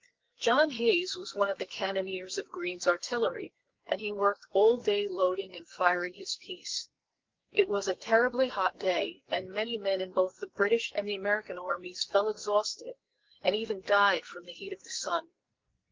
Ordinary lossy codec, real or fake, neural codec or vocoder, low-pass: Opus, 32 kbps; fake; codec, 44.1 kHz, 2.6 kbps, SNAC; 7.2 kHz